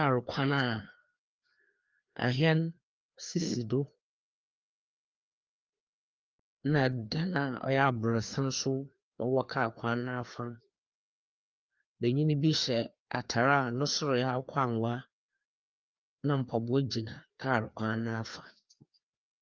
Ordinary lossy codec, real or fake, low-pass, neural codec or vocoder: Opus, 24 kbps; fake; 7.2 kHz; codec, 16 kHz, 2 kbps, FreqCodec, larger model